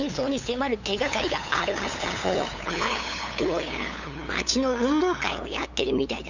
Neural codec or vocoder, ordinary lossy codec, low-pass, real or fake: codec, 16 kHz, 8 kbps, FunCodec, trained on LibriTTS, 25 frames a second; none; 7.2 kHz; fake